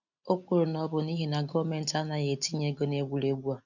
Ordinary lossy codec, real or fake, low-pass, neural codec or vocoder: none; real; 7.2 kHz; none